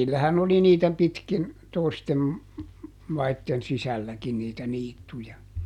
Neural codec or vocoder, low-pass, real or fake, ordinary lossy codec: none; 19.8 kHz; real; none